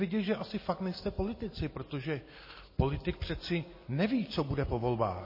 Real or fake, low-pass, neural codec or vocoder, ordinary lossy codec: real; 5.4 kHz; none; MP3, 24 kbps